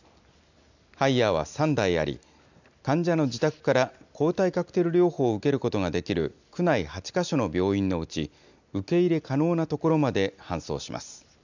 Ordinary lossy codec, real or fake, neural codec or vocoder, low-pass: none; real; none; 7.2 kHz